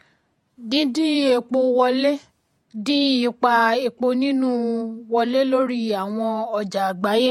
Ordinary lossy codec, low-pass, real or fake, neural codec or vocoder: MP3, 64 kbps; 19.8 kHz; fake; vocoder, 48 kHz, 128 mel bands, Vocos